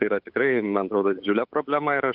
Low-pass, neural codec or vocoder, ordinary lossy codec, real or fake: 5.4 kHz; codec, 16 kHz, 8 kbps, FunCodec, trained on Chinese and English, 25 frames a second; AAC, 48 kbps; fake